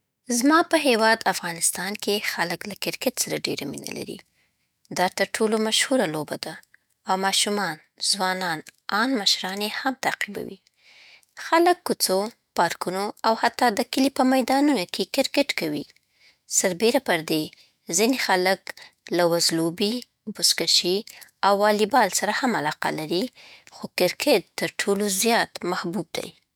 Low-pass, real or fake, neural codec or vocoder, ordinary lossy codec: none; fake; autoencoder, 48 kHz, 128 numbers a frame, DAC-VAE, trained on Japanese speech; none